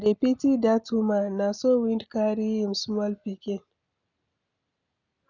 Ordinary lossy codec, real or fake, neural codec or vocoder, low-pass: none; real; none; 7.2 kHz